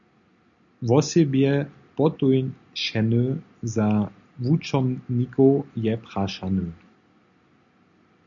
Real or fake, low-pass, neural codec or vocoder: real; 7.2 kHz; none